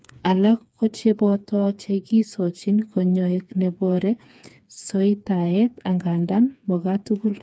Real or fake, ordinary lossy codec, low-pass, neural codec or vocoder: fake; none; none; codec, 16 kHz, 4 kbps, FreqCodec, smaller model